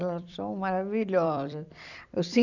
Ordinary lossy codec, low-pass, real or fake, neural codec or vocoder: none; 7.2 kHz; fake; vocoder, 44.1 kHz, 128 mel bands every 256 samples, BigVGAN v2